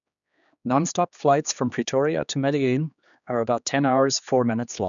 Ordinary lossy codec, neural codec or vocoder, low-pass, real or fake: MP3, 96 kbps; codec, 16 kHz, 2 kbps, X-Codec, HuBERT features, trained on general audio; 7.2 kHz; fake